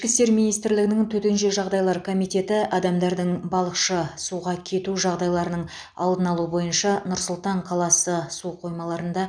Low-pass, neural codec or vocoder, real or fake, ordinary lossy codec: 9.9 kHz; none; real; none